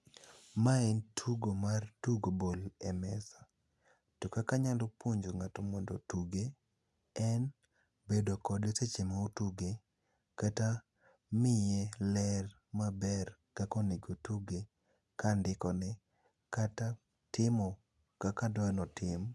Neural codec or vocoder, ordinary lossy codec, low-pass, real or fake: none; none; none; real